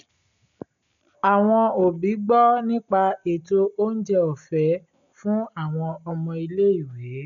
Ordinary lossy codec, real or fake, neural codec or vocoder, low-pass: none; real; none; 7.2 kHz